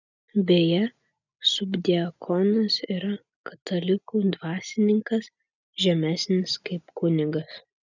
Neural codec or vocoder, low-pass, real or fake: none; 7.2 kHz; real